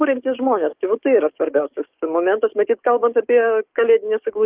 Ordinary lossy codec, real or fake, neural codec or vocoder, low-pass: Opus, 32 kbps; fake; codec, 44.1 kHz, 7.8 kbps, DAC; 3.6 kHz